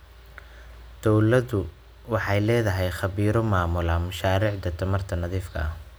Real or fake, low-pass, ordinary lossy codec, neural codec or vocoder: real; none; none; none